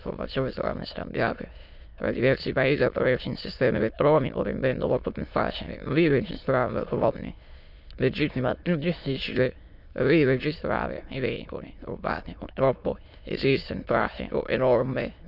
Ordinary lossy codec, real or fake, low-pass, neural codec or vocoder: none; fake; 5.4 kHz; autoencoder, 22.05 kHz, a latent of 192 numbers a frame, VITS, trained on many speakers